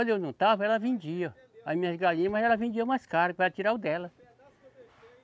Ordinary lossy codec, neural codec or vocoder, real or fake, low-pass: none; none; real; none